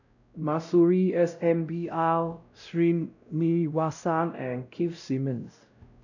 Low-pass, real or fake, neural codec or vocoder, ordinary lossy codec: 7.2 kHz; fake; codec, 16 kHz, 0.5 kbps, X-Codec, WavLM features, trained on Multilingual LibriSpeech; none